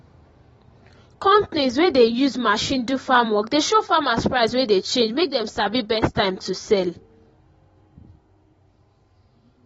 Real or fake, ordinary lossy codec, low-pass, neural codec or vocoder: fake; AAC, 24 kbps; 19.8 kHz; vocoder, 44.1 kHz, 128 mel bands every 256 samples, BigVGAN v2